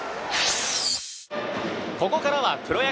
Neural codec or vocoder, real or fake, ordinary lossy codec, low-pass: none; real; none; none